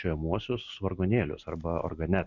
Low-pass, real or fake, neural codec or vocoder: 7.2 kHz; real; none